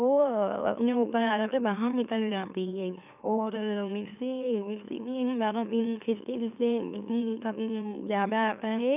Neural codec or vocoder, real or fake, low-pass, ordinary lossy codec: autoencoder, 44.1 kHz, a latent of 192 numbers a frame, MeloTTS; fake; 3.6 kHz; none